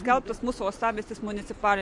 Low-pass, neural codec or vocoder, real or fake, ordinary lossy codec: 10.8 kHz; none; real; MP3, 48 kbps